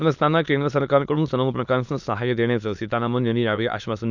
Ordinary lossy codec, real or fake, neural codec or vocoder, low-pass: none; fake; autoencoder, 22.05 kHz, a latent of 192 numbers a frame, VITS, trained on many speakers; 7.2 kHz